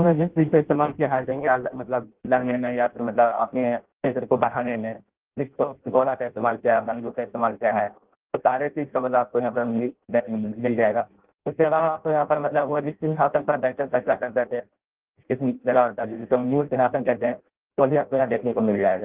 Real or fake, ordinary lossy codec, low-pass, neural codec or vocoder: fake; Opus, 16 kbps; 3.6 kHz; codec, 16 kHz in and 24 kHz out, 0.6 kbps, FireRedTTS-2 codec